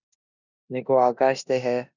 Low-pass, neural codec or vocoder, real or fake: 7.2 kHz; codec, 16 kHz in and 24 kHz out, 0.9 kbps, LongCat-Audio-Codec, four codebook decoder; fake